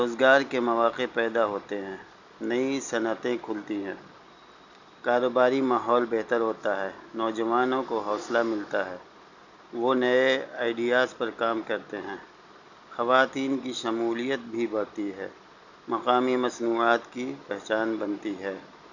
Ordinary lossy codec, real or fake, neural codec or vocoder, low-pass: none; real; none; 7.2 kHz